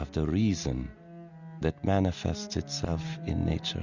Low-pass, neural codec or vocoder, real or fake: 7.2 kHz; none; real